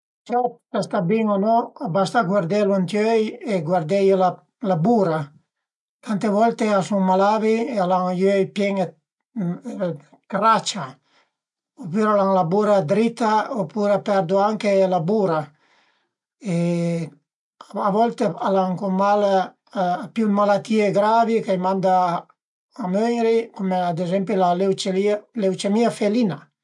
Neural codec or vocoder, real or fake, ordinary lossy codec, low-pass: none; real; MP3, 64 kbps; 10.8 kHz